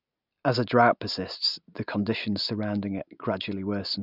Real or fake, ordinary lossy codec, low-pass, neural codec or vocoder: real; none; 5.4 kHz; none